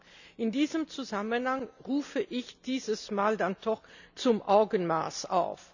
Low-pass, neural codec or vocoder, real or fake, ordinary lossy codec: 7.2 kHz; none; real; none